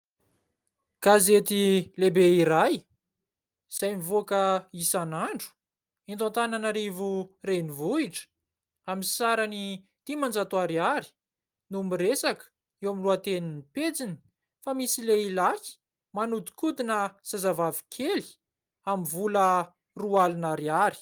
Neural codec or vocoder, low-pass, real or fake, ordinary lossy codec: none; 19.8 kHz; real; Opus, 32 kbps